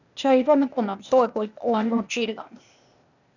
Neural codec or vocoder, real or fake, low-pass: codec, 16 kHz, 0.8 kbps, ZipCodec; fake; 7.2 kHz